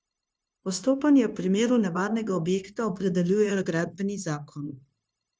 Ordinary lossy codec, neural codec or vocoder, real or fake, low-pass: none; codec, 16 kHz, 0.9 kbps, LongCat-Audio-Codec; fake; none